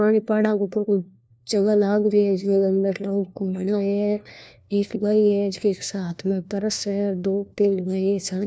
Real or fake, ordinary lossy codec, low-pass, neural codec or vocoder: fake; none; none; codec, 16 kHz, 1 kbps, FunCodec, trained on LibriTTS, 50 frames a second